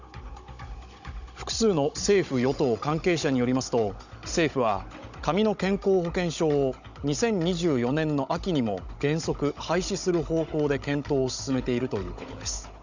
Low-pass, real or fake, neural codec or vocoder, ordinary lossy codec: 7.2 kHz; fake; codec, 16 kHz, 16 kbps, FunCodec, trained on Chinese and English, 50 frames a second; none